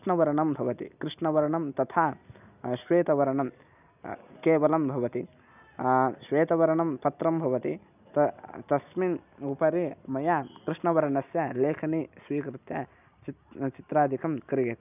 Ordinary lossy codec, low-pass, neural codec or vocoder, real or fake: none; 3.6 kHz; none; real